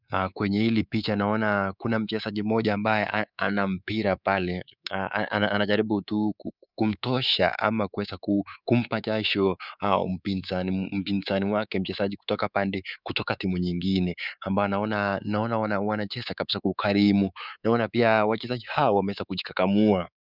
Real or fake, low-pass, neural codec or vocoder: fake; 5.4 kHz; codec, 24 kHz, 3.1 kbps, DualCodec